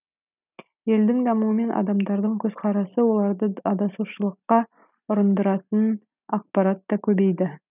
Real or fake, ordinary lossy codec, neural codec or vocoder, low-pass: real; none; none; 3.6 kHz